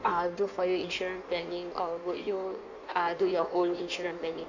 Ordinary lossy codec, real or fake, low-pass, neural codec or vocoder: none; fake; 7.2 kHz; codec, 16 kHz in and 24 kHz out, 1.1 kbps, FireRedTTS-2 codec